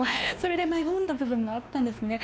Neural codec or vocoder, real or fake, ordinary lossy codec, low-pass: codec, 16 kHz, 2 kbps, X-Codec, WavLM features, trained on Multilingual LibriSpeech; fake; none; none